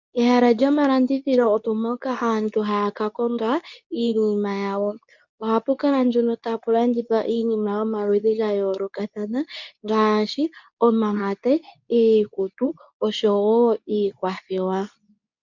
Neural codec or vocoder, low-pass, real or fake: codec, 24 kHz, 0.9 kbps, WavTokenizer, medium speech release version 2; 7.2 kHz; fake